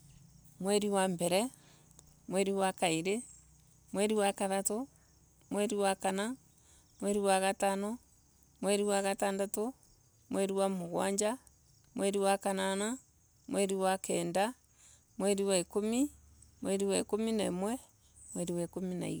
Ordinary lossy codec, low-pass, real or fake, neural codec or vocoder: none; none; real; none